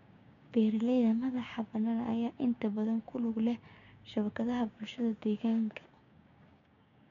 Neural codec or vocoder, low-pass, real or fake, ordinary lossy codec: codec, 16 kHz, 6 kbps, DAC; 7.2 kHz; fake; none